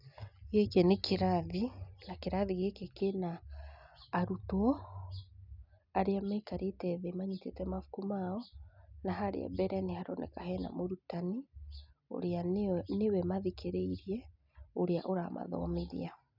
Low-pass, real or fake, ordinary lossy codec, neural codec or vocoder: 5.4 kHz; real; none; none